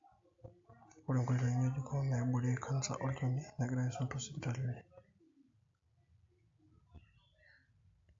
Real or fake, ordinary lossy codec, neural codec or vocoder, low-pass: real; AAC, 48 kbps; none; 7.2 kHz